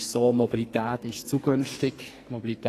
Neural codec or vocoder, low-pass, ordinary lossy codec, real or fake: codec, 44.1 kHz, 2.6 kbps, SNAC; 14.4 kHz; AAC, 48 kbps; fake